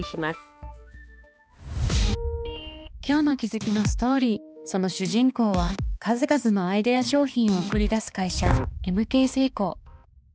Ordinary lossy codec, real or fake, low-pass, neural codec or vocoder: none; fake; none; codec, 16 kHz, 2 kbps, X-Codec, HuBERT features, trained on balanced general audio